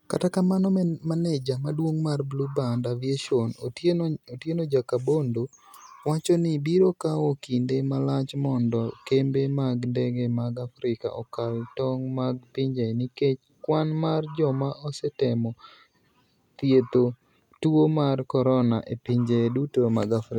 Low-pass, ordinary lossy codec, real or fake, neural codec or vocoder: 19.8 kHz; none; real; none